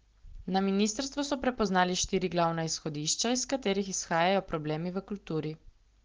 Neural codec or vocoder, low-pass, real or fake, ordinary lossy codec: none; 7.2 kHz; real; Opus, 16 kbps